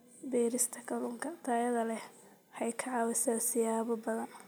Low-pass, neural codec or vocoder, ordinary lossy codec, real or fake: none; none; none; real